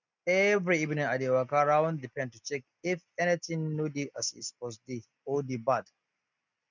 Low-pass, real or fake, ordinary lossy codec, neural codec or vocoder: 7.2 kHz; real; none; none